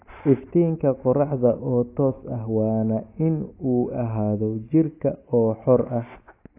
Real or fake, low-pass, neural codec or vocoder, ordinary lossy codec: real; 3.6 kHz; none; AAC, 24 kbps